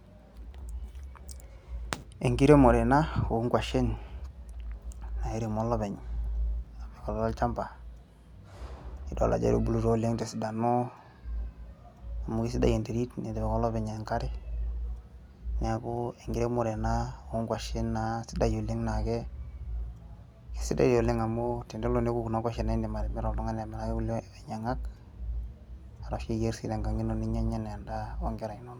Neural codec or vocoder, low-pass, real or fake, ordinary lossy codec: none; 19.8 kHz; real; none